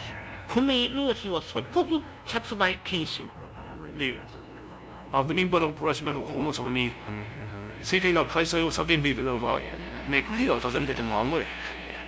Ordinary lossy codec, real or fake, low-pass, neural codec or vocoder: none; fake; none; codec, 16 kHz, 0.5 kbps, FunCodec, trained on LibriTTS, 25 frames a second